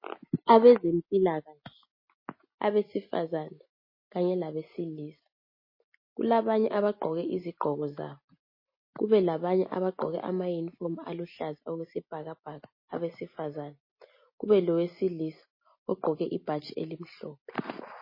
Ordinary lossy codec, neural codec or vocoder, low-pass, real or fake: MP3, 24 kbps; none; 5.4 kHz; real